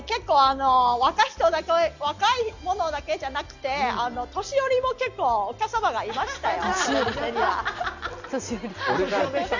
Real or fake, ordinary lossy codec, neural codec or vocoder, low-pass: real; none; none; 7.2 kHz